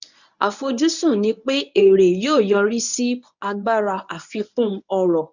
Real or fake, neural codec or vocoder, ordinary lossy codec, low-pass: fake; codec, 24 kHz, 0.9 kbps, WavTokenizer, medium speech release version 1; none; 7.2 kHz